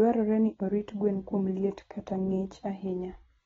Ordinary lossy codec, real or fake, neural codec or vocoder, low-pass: AAC, 24 kbps; real; none; 7.2 kHz